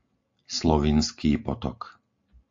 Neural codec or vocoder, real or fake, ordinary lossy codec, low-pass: none; real; AAC, 64 kbps; 7.2 kHz